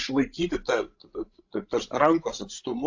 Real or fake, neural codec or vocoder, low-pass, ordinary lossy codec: fake; codec, 16 kHz, 16 kbps, FreqCodec, larger model; 7.2 kHz; AAC, 48 kbps